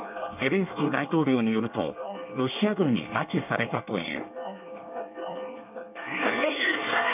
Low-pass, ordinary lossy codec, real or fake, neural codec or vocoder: 3.6 kHz; none; fake; codec, 24 kHz, 1 kbps, SNAC